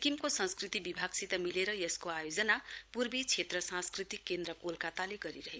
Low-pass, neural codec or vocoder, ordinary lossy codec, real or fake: none; codec, 16 kHz, 16 kbps, FunCodec, trained on LibriTTS, 50 frames a second; none; fake